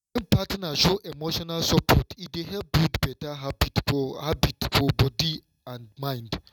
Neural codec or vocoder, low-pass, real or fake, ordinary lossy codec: none; 19.8 kHz; real; none